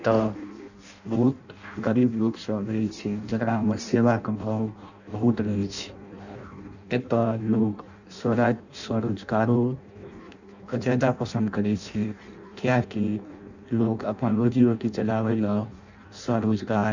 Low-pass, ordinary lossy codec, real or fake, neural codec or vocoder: 7.2 kHz; none; fake; codec, 16 kHz in and 24 kHz out, 0.6 kbps, FireRedTTS-2 codec